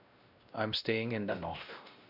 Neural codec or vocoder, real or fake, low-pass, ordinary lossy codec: codec, 16 kHz, 1 kbps, X-Codec, WavLM features, trained on Multilingual LibriSpeech; fake; 5.4 kHz; none